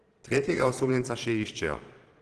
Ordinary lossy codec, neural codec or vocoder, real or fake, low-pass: Opus, 16 kbps; vocoder, 22.05 kHz, 80 mel bands, Vocos; fake; 9.9 kHz